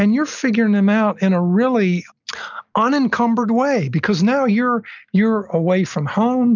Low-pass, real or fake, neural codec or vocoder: 7.2 kHz; real; none